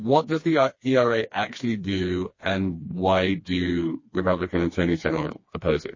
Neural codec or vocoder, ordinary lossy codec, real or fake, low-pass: codec, 16 kHz, 2 kbps, FreqCodec, smaller model; MP3, 32 kbps; fake; 7.2 kHz